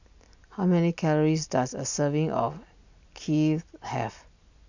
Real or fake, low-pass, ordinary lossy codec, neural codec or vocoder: real; 7.2 kHz; none; none